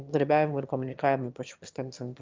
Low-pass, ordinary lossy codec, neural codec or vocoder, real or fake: 7.2 kHz; Opus, 24 kbps; autoencoder, 22.05 kHz, a latent of 192 numbers a frame, VITS, trained on one speaker; fake